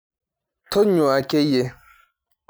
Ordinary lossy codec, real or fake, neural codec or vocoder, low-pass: none; real; none; none